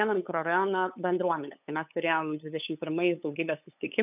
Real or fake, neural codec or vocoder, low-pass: fake; codec, 16 kHz, 8 kbps, FunCodec, trained on LibriTTS, 25 frames a second; 3.6 kHz